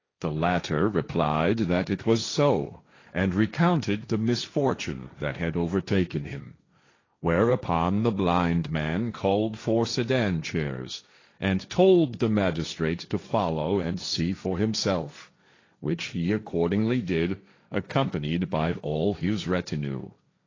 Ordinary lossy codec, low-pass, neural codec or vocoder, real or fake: AAC, 32 kbps; 7.2 kHz; codec, 16 kHz, 1.1 kbps, Voila-Tokenizer; fake